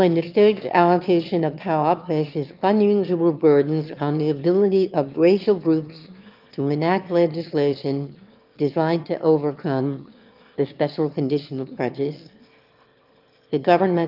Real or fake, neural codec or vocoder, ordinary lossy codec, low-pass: fake; autoencoder, 22.05 kHz, a latent of 192 numbers a frame, VITS, trained on one speaker; Opus, 24 kbps; 5.4 kHz